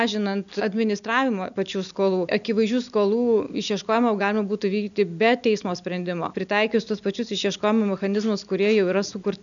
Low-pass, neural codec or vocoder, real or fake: 7.2 kHz; none; real